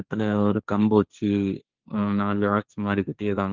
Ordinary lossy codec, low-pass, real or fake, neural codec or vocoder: Opus, 32 kbps; 7.2 kHz; fake; codec, 16 kHz, 1.1 kbps, Voila-Tokenizer